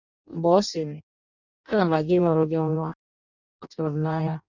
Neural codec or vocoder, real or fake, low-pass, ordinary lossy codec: codec, 16 kHz in and 24 kHz out, 0.6 kbps, FireRedTTS-2 codec; fake; 7.2 kHz; none